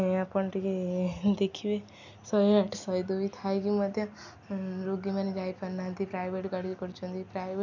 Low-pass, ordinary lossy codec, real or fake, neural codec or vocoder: 7.2 kHz; none; real; none